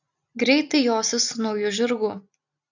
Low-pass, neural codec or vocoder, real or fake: 7.2 kHz; none; real